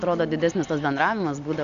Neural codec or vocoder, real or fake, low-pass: none; real; 7.2 kHz